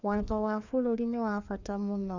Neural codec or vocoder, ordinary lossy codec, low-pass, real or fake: codec, 16 kHz, 2 kbps, FreqCodec, larger model; none; 7.2 kHz; fake